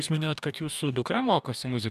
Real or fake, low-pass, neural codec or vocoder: fake; 14.4 kHz; codec, 44.1 kHz, 2.6 kbps, DAC